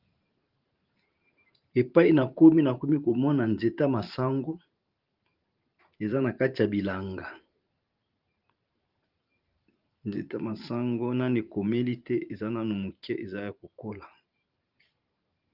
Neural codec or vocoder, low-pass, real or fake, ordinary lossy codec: vocoder, 44.1 kHz, 128 mel bands every 512 samples, BigVGAN v2; 5.4 kHz; fake; Opus, 32 kbps